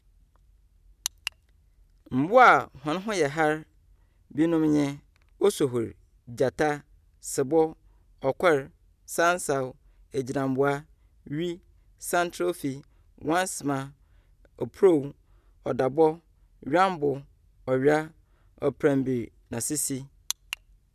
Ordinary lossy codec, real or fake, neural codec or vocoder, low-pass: none; real; none; 14.4 kHz